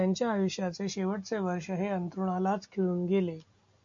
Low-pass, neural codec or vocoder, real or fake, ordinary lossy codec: 7.2 kHz; none; real; MP3, 64 kbps